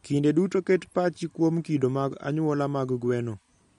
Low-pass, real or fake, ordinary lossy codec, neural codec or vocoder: 19.8 kHz; real; MP3, 48 kbps; none